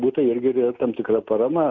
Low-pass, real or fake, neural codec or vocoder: 7.2 kHz; real; none